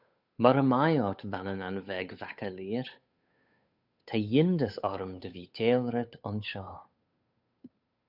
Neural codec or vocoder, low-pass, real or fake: codec, 16 kHz, 8 kbps, FunCodec, trained on Chinese and English, 25 frames a second; 5.4 kHz; fake